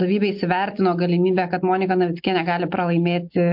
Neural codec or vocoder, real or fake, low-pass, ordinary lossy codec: none; real; 5.4 kHz; MP3, 48 kbps